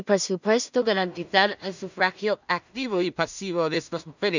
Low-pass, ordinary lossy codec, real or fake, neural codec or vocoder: 7.2 kHz; none; fake; codec, 16 kHz in and 24 kHz out, 0.4 kbps, LongCat-Audio-Codec, two codebook decoder